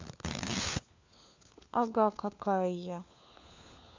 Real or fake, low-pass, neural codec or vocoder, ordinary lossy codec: fake; 7.2 kHz; codec, 16 kHz, 4 kbps, FunCodec, trained on LibriTTS, 50 frames a second; MP3, 64 kbps